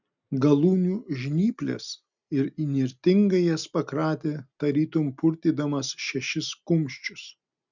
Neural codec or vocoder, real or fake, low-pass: none; real; 7.2 kHz